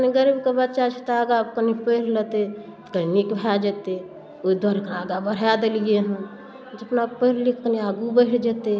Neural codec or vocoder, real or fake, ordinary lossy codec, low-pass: none; real; none; none